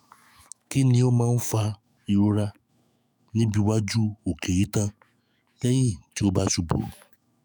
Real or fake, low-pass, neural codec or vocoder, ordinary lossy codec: fake; none; autoencoder, 48 kHz, 128 numbers a frame, DAC-VAE, trained on Japanese speech; none